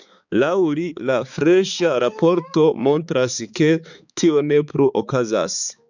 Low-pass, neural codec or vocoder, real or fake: 7.2 kHz; codec, 16 kHz, 4 kbps, X-Codec, HuBERT features, trained on balanced general audio; fake